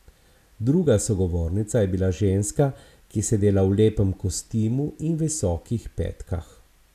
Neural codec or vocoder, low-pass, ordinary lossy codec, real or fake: none; 14.4 kHz; none; real